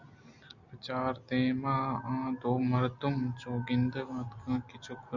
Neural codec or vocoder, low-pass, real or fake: none; 7.2 kHz; real